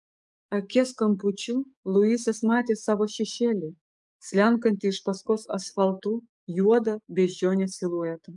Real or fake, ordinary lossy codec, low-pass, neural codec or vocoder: fake; MP3, 96 kbps; 10.8 kHz; codec, 44.1 kHz, 7.8 kbps, DAC